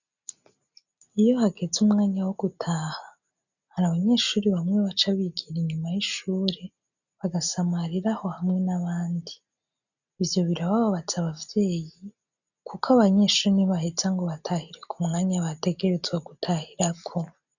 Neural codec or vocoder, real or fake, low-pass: none; real; 7.2 kHz